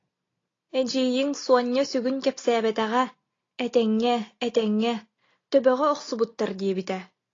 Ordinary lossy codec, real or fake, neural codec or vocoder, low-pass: AAC, 32 kbps; real; none; 7.2 kHz